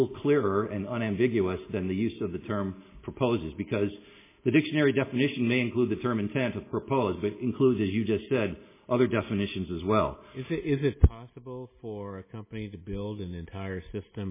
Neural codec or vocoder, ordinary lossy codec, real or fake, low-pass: none; MP3, 16 kbps; real; 3.6 kHz